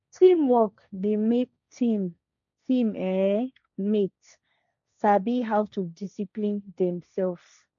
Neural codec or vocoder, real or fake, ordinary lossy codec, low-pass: codec, 16 kHz, 1.1 kbps, Voila-Tokenizer; fake; none; 7.2 kHz